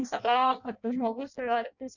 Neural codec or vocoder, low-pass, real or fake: codec, 16 kHz in and 24 kHz out, 0.6 kbps, FireRedTTS-2 codec; 7.2 kHz; fake